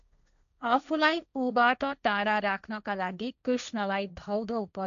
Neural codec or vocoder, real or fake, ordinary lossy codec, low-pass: codec, 16 kHz, 1.1 kbps, Voila-Tokenizer; fake; none; 7.2 kHz